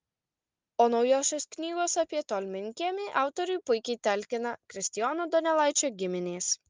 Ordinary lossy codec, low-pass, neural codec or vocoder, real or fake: Opus, 32 kbps; 7.2 kHz; none; real